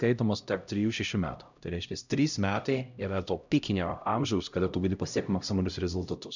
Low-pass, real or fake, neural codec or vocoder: 7.2 kHz; fake; codec, 16 kHz, 0.5 kbps, X-Codec, HuBERT features, trained on LibriSpeech